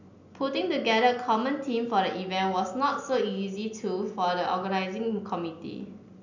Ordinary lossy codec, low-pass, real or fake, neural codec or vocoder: none; 7.2 kHz; real; none